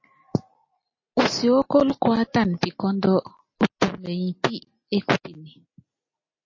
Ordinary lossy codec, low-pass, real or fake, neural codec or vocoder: MP3, 32 kbps; 7.2 kHz; real; none